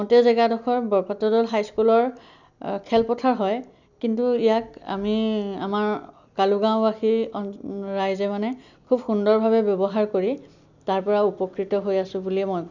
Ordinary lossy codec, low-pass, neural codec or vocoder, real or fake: none; 7.2 kHz; none; real